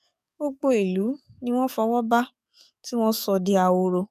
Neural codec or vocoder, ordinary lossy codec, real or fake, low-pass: codec, 44.1 kHz, 7.8 kbps, DAC; none; fake; 14.4 kHz